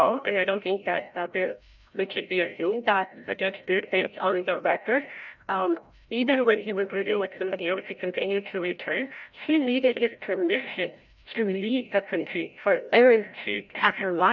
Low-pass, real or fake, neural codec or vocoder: 7.2 kHz; fake; codec, 16 kHz, 0.5 kbps, FreqCodec, larger model